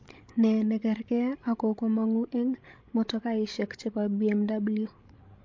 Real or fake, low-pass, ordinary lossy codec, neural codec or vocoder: fake; 7.2 kHz; MP3, 48 kbps; codec, 16 kHz, 16 kbps, FreqCodec, larger model